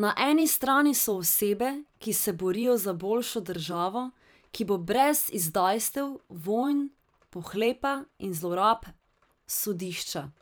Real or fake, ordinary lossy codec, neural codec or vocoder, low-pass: fake; none; vocoder, 44.1 kHz, 128 mel bands every 512 samples, BigVGAN v2; none